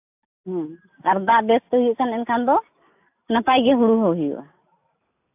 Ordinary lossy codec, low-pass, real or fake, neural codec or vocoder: AAC, 32 kbps; 3.6 kHz; real; none